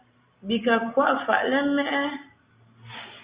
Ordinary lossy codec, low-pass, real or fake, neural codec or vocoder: Opus, 64 kbps; 3.6 kHz; real; none